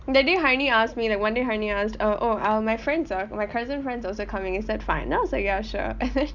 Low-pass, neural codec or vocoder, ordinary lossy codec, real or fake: 7.2 kHz; none; none; real